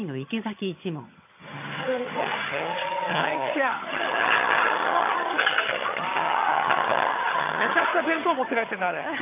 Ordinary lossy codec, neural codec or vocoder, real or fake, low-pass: AAC, 24 kbps; vocoder, 22.05 kHz, 80 mel bands, HiFi-GAN; fake; 3.6 kHz